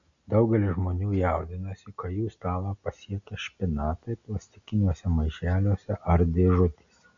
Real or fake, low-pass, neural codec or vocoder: real; 7.2 kHz; none